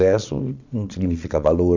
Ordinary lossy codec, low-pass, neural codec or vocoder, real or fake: none; 7.2 kHz; vocoder, 22.05 kHz, 80 mel bands, Vocos; fake